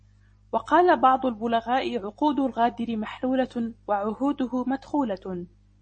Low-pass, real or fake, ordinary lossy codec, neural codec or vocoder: 9.9 kHz; real; MP3, 32 kbps; none